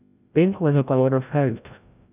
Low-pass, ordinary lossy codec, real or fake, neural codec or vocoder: 3.6 kHz; none; fake; codec, 16 kHz, 0.5 kbps, FreqCodec, larger model